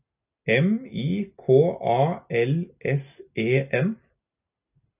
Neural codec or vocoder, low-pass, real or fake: none; 3.6 kHz; real